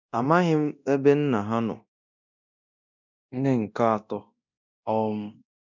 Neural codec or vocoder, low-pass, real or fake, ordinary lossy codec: codec, 24 kHz, 0.9 kbps, DualCodec; 7.2 kHz; fake; none